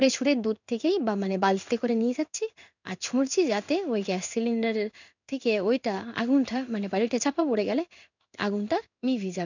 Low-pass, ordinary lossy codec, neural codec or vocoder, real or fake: 7.2 kHz; none; codec, 16 kHz in and 24 kHz out, 1 kbps, XY-Tokenizer; fake